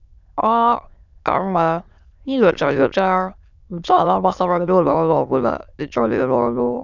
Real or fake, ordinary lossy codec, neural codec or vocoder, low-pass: fake; none; autoencoder, 22.05 kHz, a latent of 192 numbers a frame, VITS, trained on many speakers; 7.2 kHz